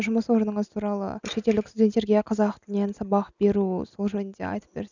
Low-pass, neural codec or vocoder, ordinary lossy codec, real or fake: 7.2 kHz; none; none; real